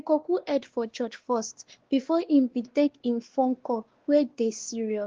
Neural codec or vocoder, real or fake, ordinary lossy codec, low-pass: codec, 16 kHz, 2 kbps, X-Codec, WavLM features, trained on Multilingual LibriSpeech; fake; Opus, 16 kbps; 7.2 kHz